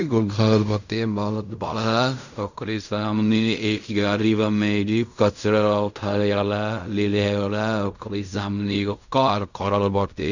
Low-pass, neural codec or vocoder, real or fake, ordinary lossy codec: 7.2 kHz; codec, 16 kHz in and 24 kHz out, 0.4 kbps, LongCat-Audio-Codec, fine tuned four codebook decoder; fake; none